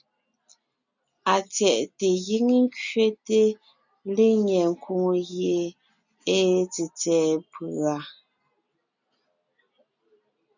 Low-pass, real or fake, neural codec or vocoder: 7.2 kHz; real; none